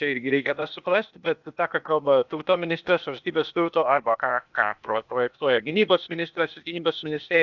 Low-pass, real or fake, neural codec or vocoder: 7.2 kHz; fake; codec, 16 kHz, 0.8 kbps, ZipCodec